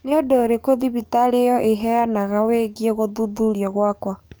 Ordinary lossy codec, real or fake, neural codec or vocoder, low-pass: none; fake; codec, 44.1 kHz, 7.8 kbps, DAC; none